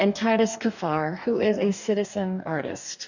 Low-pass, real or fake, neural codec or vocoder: 7.2 kHz; fake; codec, 44.1 kHz, 2.6 kbps, DAC